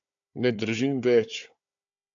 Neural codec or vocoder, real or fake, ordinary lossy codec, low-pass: codec, 16 kHz, 4 kbps, FunCodec, trained on Chinese and English, 50 frames a second; fake; AAC, 64 kbps; 7.2 kHz